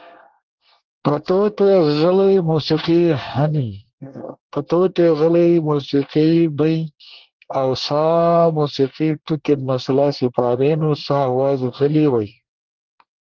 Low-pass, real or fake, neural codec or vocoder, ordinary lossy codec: 7.2 kHz; fake; codec, 24 kHz, 1 kbps, SNAC; Opus, 16 kbps